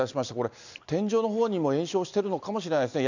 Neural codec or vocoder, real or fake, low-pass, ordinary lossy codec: none; real; 7.2 kHz; none